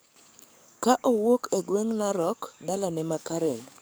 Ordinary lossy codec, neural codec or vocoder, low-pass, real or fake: none; codec, 44.1 kHz, 7.8 kbps, DAC; none; fake